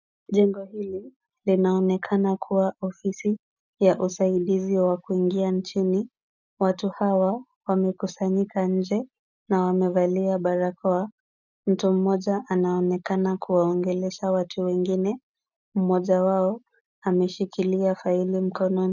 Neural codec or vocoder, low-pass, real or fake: none; 7.2 kHz; real